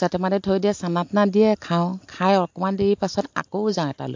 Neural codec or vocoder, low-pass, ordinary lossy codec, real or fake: none; 7.2 kHz; MP3, 48 kbps; real